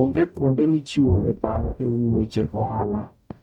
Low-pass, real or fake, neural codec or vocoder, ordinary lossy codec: 19.8 kHz; fake; codec, 44.1 kHz, 0.9 kbps, DAC; none